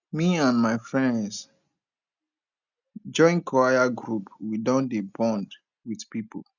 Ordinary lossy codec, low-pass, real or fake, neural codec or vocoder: none; 7.2 kHz; real; none